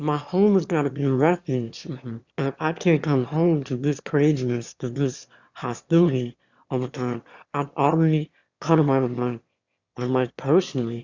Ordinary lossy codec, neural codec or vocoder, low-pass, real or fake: Opus, 64 kbps; autoencoder, 22.05 kHz, a latent of 192 numbers a frame, VITS, trained on one speaker; 7.2 kHz; fake